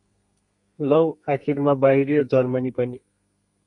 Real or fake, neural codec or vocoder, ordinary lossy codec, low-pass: fake; codec, 32 kHz, 1.9 kbps, SNAC; MP3, 64 kbps; 10.8 kHz